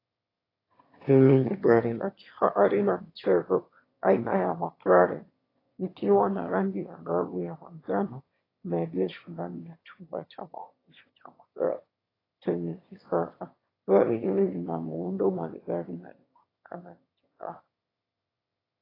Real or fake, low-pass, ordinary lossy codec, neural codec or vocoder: fake; 5.4 kHz; AAC, 24 kbps; autoencoder, 22.05 kHz, a latent of 192 numbers a frame, VITS, trained on one speaker